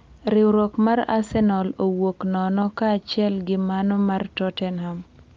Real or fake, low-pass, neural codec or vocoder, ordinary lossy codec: real; 7.2 kHz; none; Opus, 32 kbps